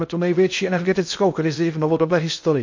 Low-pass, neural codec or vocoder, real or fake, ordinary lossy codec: 7.2 kHz; codec, 16 kHz in and 24 kHz out, 0.6 kbps, FocalCodec, streaming, 2048 codes; fake; MP3, 48 kbps